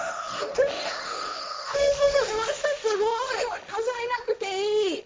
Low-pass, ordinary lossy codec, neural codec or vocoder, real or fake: none; none; codec, 16 kHz, 1.1 kbps, Voila-Tokenizer; fake